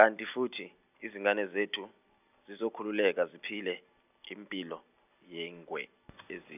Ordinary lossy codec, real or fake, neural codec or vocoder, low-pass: none; real; none; 3.6 kHz